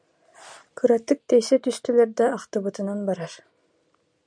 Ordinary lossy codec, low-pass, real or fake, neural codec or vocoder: MP3, 96 kbps; 9.9 kHz; real; none